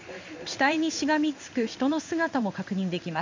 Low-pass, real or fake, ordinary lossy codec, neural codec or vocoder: 7.2 kHz; fake; MP3, 64 kbps; codec, 16 kHz in and 24 kHz out, 1 kbps, XY-Tokenizer